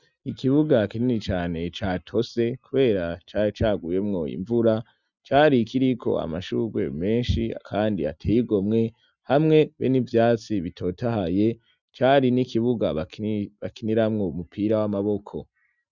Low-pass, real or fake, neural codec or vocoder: 7.2 kHz; real; none